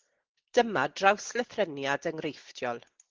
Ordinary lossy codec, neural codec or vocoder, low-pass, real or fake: Opus, 16 kbps; none; 7.2 kHz; real